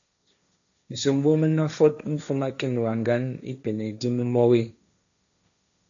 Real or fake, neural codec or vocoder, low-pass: fake; codec, 16 kHz, 1.1 kbps, Voila-Tokenizer; 7.2 kHz